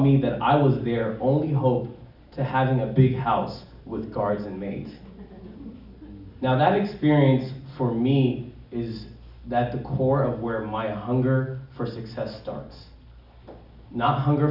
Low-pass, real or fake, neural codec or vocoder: 5.4 kHz; real; none